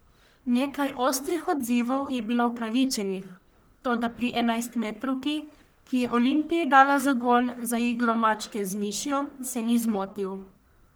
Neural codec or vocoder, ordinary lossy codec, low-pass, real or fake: codec, 44.1 kHz, 1.7 kbps, Pupu-Codec; none; none; fake